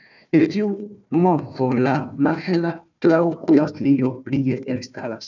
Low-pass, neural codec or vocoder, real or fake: 7.2 kHz; codec, 16 kHz, 1 kbps, FunCodec, trained on Chinese and English, 50 frames a second; fake